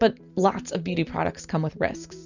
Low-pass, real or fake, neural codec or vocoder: 7.2 kHz; real; none